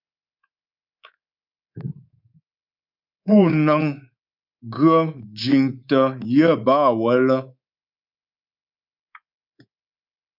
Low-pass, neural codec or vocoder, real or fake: 5.4 kHz; codec, 24 kHz, 3.1 kbps, DualCodec; fake